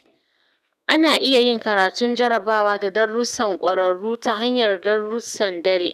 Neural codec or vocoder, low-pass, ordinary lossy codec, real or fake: codec, 32 kHz, 1.9 kbps, SNAC; 14.4 kHz; none; fake